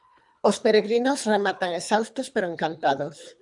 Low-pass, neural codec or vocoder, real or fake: 10.8 kHz; codec, 24 kHz, 3 kbps, HILCodec; fake